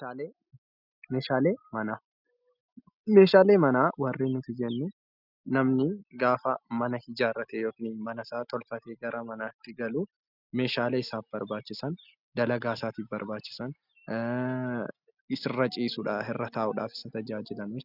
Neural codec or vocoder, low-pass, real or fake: none; 5.4 kHz; real